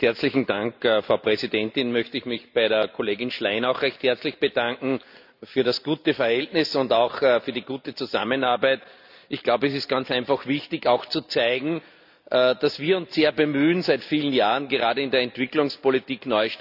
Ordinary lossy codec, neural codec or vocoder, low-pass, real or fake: none; none; 5.4 kHz; real